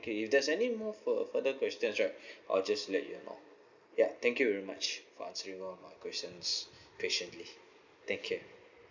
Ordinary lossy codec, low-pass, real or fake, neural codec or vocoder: none; 7.2 kHz; real; none